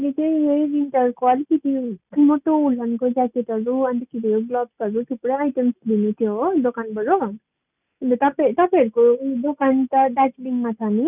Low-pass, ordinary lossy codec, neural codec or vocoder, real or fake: 3.6 kHz; none; none; real